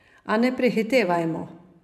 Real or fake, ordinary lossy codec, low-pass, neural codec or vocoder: fake; none; 14.4 kHz; vocoder, 44.1 kHz, 128 mel bands every 512 samples, BigVGAN v2